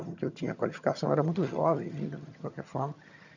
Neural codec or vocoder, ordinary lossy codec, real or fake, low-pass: vocoder, 22.05 kHz, 80 mel bands, HiFi-GAN; none; fake; 7.2 kHz